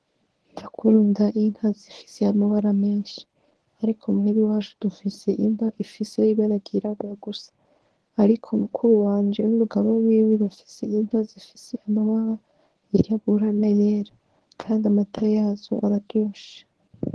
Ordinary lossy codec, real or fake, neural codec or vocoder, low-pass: Opus, 16 kbps; fake; codec, 24 kHz, 0.9 kbps, WavTokenizer, medium speech release version 1; 10.8 kHz